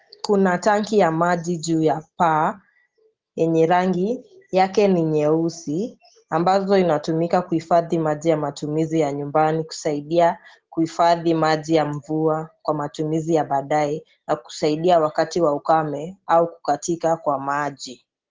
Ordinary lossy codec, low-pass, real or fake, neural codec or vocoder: Opus, 16 kbps; 7.2 kHz; real; none